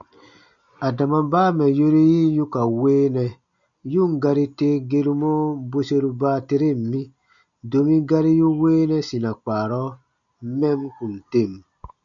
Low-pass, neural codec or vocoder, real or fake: 7.2 kHz; none; real